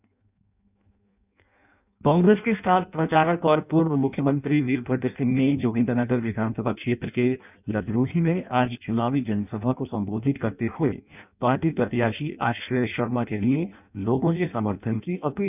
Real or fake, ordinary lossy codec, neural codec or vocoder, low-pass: fake; none; codec, 16 kHz in and 24 kHz out, 0.6 kbps, FireRedTTS-2 codec; 3.6 kHz